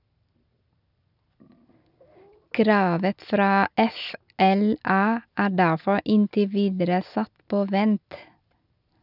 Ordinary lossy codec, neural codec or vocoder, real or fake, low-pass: none; none; real; 5.4 kHz